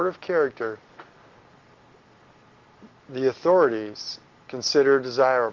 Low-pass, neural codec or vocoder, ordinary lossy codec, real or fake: 7.2 kHz; none; Opus, 16 kbps; real